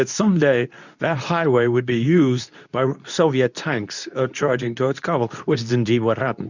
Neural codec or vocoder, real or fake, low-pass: codec, 24 kHz, 0.9 kbps, WavTokenizer, medium speech release version 2; fake; 7.2 kHz